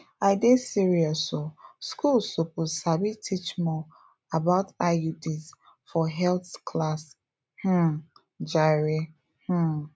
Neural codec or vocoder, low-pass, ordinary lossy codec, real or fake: none; none; none; real